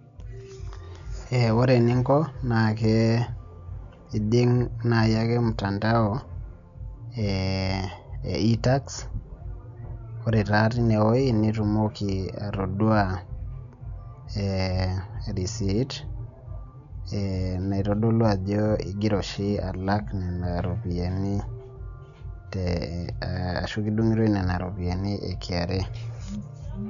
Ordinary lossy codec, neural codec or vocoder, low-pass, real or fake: none; none; 7.2 kHz; real